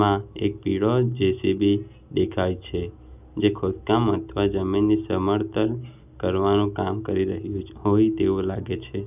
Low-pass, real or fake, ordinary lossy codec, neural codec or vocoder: 3.6 kHz; real; none; none